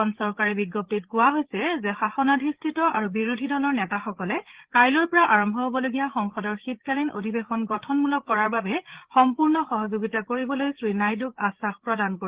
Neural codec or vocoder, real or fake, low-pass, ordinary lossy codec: codec, 16 kHz, 8 kbps, FreqCodec, larger model; fake; 3.6 kHz; Opus, 16 kbps